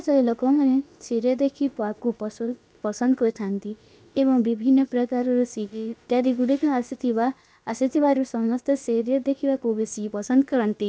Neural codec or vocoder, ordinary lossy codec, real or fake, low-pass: codec, 16 kHz, about 1 kbps, DyCAST, with the encoder's durations; none; fake; none